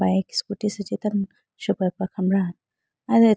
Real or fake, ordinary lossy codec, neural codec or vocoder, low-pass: real; none; none; none